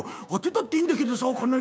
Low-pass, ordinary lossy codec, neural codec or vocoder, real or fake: none; none; codec, 16 kHz, 6 kbps, DAC; fake